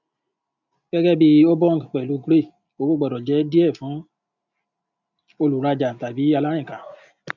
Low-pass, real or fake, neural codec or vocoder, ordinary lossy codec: 7.2 kHz; real; none; none